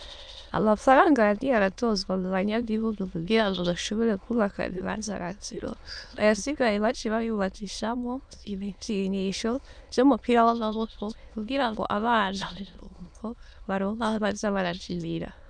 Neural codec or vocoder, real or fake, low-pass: autoencoder, 22.05 kHz, a latent of 192 numbers a frame, VITS, trained on many speakers; fake; 9.9 kHz